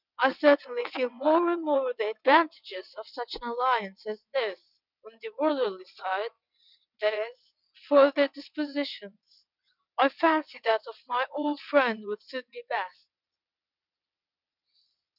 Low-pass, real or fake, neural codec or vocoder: 5.4 kHz; fake; vocoder, 22.05 kHz, 80 mel bands, WaveNeXt